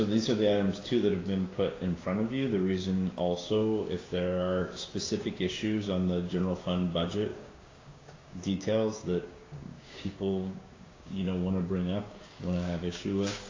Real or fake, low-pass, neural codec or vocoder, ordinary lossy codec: fake; 7.2 kHz; codec, 16 kHz, 6 kbps, DAC; AAC, 32 kbps